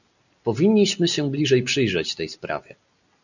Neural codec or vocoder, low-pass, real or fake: none; 7.2 kHz; real